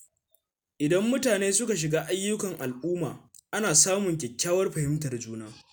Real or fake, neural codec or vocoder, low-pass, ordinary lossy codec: real; none; none; none